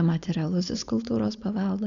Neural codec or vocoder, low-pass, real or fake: codec, 16 kHz, 4.8 kbps, FACodec; 7.2 kHz; fake